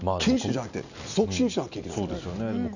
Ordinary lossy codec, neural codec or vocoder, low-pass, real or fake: none; none; 7.2 kHz; real